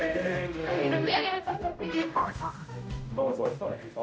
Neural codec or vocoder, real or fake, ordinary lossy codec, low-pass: codec, 16 kHz, 0.5 kbps, X-Codec, HuBERT features, trained on general audio; fake; none; none